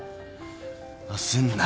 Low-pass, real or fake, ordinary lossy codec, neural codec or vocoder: none; real; none; none